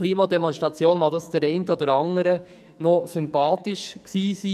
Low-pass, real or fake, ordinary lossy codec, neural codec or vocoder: 14.4 kHz; fake; none; codec, 44.1 kHz, 2.6 kbps, SNAC